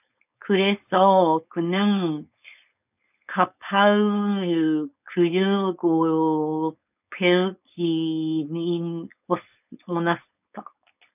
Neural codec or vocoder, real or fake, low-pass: codec, 16 kHz, 4.8 kbps, FACodec; fake; 3.6 kHz